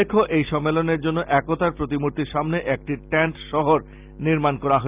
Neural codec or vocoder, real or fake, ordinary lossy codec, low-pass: none; real; Opus, 24 kbps; 3.6 kHz